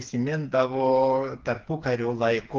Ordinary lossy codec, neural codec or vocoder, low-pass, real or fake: Opus, 32 kbps; codec, 16 kHz, 4 kbps, FreqCodec, smaller model; 7.2 kHz; fake